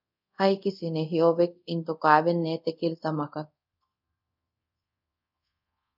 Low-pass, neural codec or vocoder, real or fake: 5.4 kHz; codec, 24 kHz, 0.5 kbps, DualCodec; fake